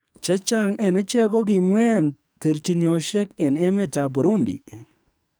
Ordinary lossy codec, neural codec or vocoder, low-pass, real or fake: none; codec, 44.1 kHz, 2.6 kbps, SNAC; none; fake